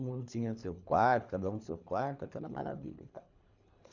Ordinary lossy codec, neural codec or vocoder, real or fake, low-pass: none; codec, 24 kHz, 3 kbps, HILCodec; fake; 7.2 kHz